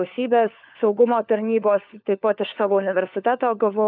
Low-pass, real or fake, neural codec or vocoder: 5.4 kHz; fake; codec, 16 kHz, 4 kbps, FunCodec, trained on LibriTTS, 50 frames a second